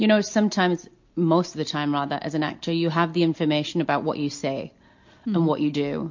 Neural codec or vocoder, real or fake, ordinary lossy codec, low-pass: none; real; MP3, 48 kbps; 7.2 kHz